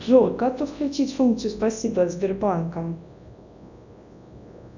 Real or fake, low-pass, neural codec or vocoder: fake; 7.2 kHz; codec, 24 kHz, 0.9 kbps, WavTokenizer, large speech release